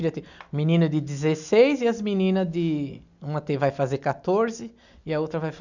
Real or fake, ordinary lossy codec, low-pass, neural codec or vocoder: real; none; 7.2 kHz; none